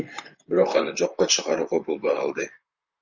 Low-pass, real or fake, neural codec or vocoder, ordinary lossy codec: 7.2 kHz; fake; vocoder, 44.1 kHz, 128 mel bands, Pupu-Vocoder; Opus, 64 kbps